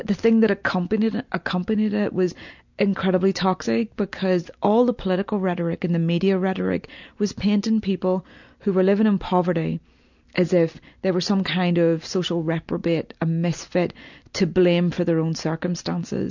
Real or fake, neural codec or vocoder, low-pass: real; none; 7.2 kHz